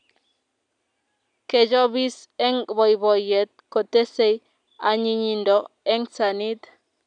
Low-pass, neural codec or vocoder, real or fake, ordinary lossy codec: 9.9 kHz; none; real; none